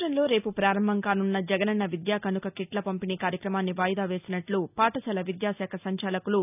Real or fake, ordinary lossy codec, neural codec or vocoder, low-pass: real; none; none; 3.6 kHz